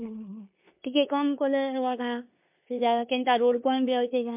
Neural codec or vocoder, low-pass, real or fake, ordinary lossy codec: codec, 16 kHz in and 24 kHz out, 0.9 kbps, LongCat-Audio-Codec, four codebook decoder; 3.6 kHz; fake; MP3, 32 kbps